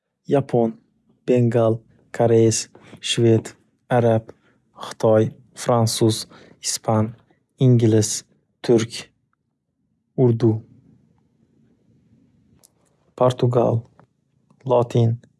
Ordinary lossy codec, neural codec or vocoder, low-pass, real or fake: none; none; none; real